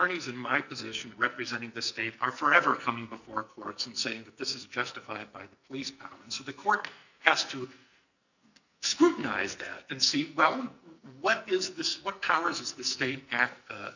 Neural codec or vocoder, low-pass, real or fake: codec, 44.1 kHz, 2.6 kbps, SNAC; 7.2 kHz; fake